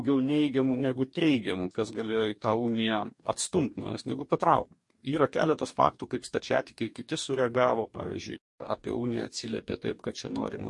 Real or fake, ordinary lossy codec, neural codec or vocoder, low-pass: fake; MP3, 48 kbps; codec, 44.1 kHz, 2.6 kbps, DAC; 10.8 kHz